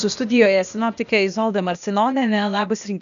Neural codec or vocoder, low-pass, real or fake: codec, 16 kHz, 0.8 kbps, ZipCodec; 7.2 kHz; fake